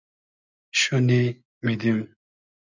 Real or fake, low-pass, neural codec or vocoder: real; 7.2 kHz; none